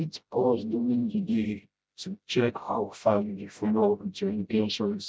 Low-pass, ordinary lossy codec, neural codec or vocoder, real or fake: none; none; codec, 16 kHz, 0.5 kbps, FreqCodec, smaller model; fake